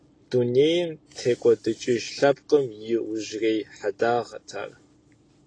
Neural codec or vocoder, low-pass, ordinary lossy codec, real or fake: none; 9.9 kHz; AAC, 32 kbps; real